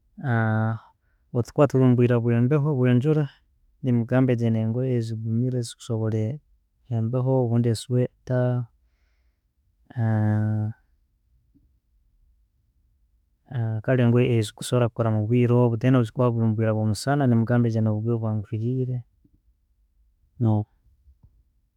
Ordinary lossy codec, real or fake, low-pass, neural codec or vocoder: none; fake; 19.8 kHz; autoencoder, 48 kHz, 32 numbers a frame, DAC-VAE, trained on Japanese speech